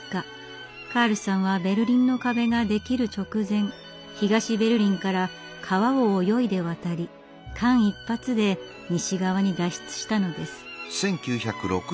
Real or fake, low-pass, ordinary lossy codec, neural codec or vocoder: real; none; none; none